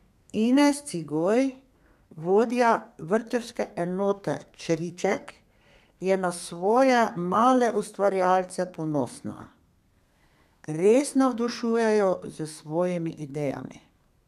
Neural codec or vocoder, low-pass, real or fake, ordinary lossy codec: codec, 32 kHz, 1.9 kbps, SNAC; 14.4 kHz; fake; none